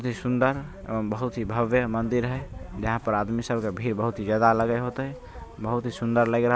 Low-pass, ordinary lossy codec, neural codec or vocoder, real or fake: none; none; none; real